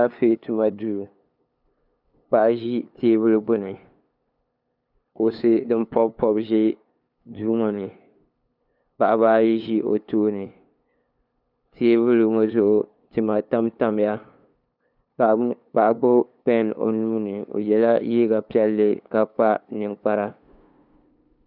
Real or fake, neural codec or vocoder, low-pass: fake; codec, 16 kHz, 2 kbps, FunCodec, trained on LibriTTS, 25 frames a second; 5.4 kHz